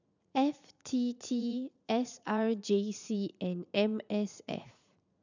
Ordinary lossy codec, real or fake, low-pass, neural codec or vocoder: none; fake; 7.2 kHz; vocoder, 44.1 kHz, 80 mel bands, Vocos